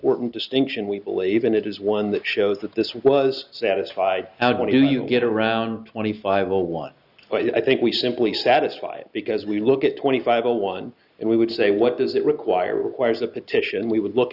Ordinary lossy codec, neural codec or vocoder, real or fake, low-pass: Opus, 64 kbps; none; real; 5.4 kHz